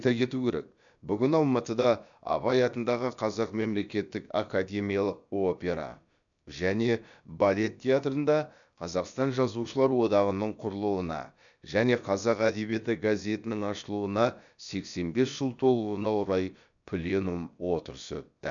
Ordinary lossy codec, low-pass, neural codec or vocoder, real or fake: none; 7.2 kHz; codec, 16 kHz, about 1 kbps, DyCAST, with the encoder's durations; fake